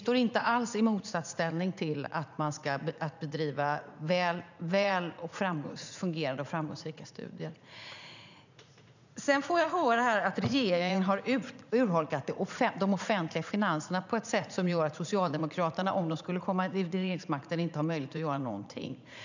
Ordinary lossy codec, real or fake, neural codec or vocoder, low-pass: none; fake; vocoder, 44.1 kHz, 80 mel bands, Vocos; 7.2 kHz